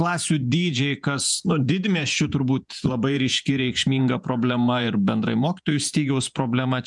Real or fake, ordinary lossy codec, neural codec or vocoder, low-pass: fake; MP3, 96 kbps; vocoder, 48 kHz, 128 mel bands, Vocos; 10.8 kHz